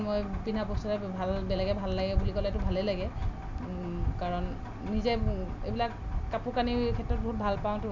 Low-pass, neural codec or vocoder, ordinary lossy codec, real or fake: 7.2 kHz; none; none; real